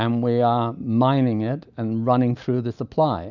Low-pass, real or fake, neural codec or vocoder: 7.2 kHz; real; none